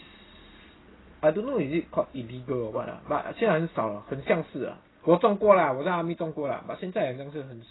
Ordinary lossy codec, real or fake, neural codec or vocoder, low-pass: AAC, 16 kbps; real; none; 7.2 kHz